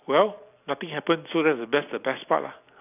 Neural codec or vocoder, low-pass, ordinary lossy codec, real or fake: none; 3.6 kHz; none; real